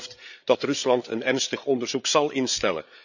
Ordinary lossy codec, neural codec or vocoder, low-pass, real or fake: none; codec, 16 kHz, 8 kbps, FreqCodec, larger model; 7.2 kHz; fake